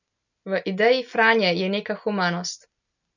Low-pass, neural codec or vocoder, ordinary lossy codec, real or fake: 7.2 kHz; none; none; real